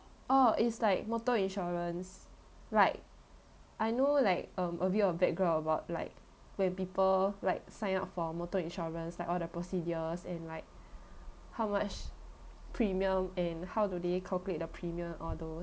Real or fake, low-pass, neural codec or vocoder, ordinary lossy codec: real; none; none; none